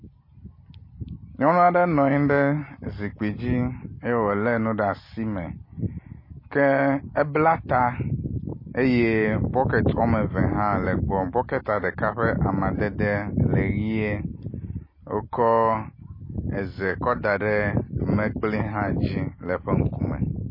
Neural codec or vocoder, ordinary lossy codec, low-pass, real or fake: none; MP3, 24 kbps; 5.4 kHz; real